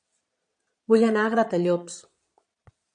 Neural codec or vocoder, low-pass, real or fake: vocoder, 22.05 kHz, 80 mel bands, Vocos; 9.9 kHz; fake